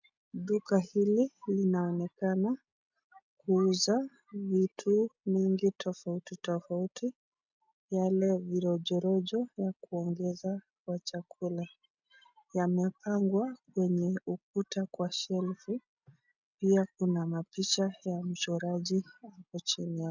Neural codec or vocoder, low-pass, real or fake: none; 7.2 kHz; real